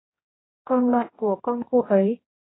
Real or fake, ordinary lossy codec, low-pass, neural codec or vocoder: fake; AAC, 16 kbps; 7.2 kHz; codec, 16 kHz, 1 kbps, X-Codec, HuBERT features, trained on general audio